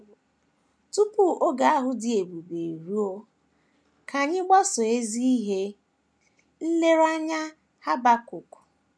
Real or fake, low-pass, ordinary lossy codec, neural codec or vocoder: real; none; none; none